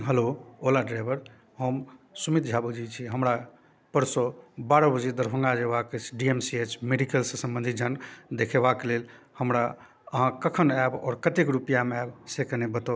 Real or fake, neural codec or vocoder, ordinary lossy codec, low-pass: real; none; none; none